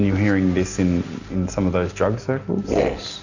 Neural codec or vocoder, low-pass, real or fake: none; 7.2 kHz; real